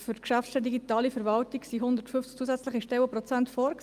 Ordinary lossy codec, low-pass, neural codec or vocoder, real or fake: Opus, 32 kbps; 14.4 kHz; none; real